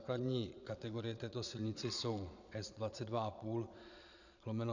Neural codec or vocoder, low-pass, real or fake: vocoder, 22.05 kHz, 80 mel bands, Vocos; 7.2 kHz; fake